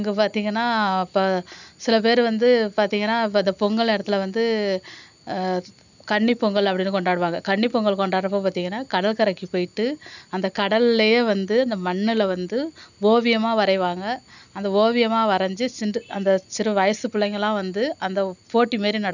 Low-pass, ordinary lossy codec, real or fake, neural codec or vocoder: 7.2 kHz; none; real; none